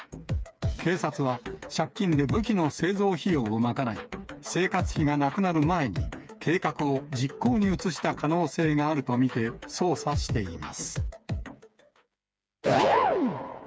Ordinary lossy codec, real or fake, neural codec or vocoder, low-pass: none; fake; codec, 16 kHz, 4 kbps, FreqCodec, smaller model; none